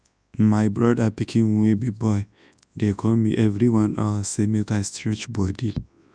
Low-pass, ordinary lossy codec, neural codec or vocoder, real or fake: 9.9 kHz; none; codec, 24 kHz, 0.9 kbps, WavTokenizer, large speech release; fake